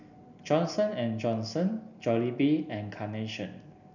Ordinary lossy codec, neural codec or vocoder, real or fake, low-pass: none; none; real; 7.2 kHz